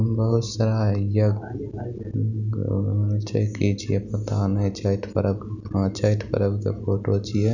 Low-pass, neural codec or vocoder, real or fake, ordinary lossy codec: 7.2 kHz; none; real; none